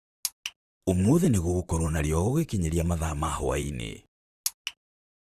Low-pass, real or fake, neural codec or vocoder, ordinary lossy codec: 14.4 kHz; fake; vocoder, 44.1 kHz, 128 mel bands, Pupu-Vocoder; Opus, 64 kbps